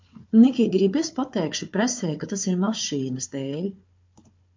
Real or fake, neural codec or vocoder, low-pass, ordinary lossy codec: fake; codec, 16 kHz, 4 kbps, FunCodec, trained on Chinese and English, 50 frames a second; 7.2 kHz; MP3, 48 kbps